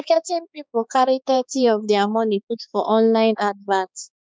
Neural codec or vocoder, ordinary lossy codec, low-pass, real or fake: codec, 16 kHz, 4 kbps, X-Codec, HuBERT features, trained on balanced general audio; none; none; fake